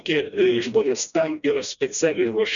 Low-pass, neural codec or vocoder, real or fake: 7.2 kHz; codec, 16 kHz, 1 kbps, FreqCodec, smaller model; fake